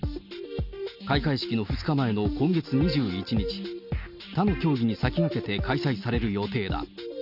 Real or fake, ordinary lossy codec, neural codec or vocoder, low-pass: real; none; none; 5.4 kHz